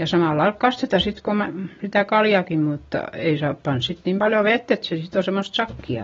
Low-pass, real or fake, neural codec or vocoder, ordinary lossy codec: 7.2 kHz; real; none; AAC, 24 kbps